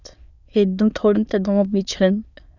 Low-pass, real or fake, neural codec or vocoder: 7.2 kHz; fake; autoencoder, 22.05 kHz, a latent of 192 numbers a frame, VITS, trained on many speakers